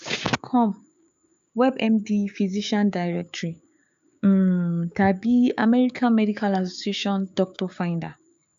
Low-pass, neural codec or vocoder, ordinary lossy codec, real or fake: 7.2 kHz; codec, 16 kHz, 6 kbps, DAC; MP3, 96 kbps; fake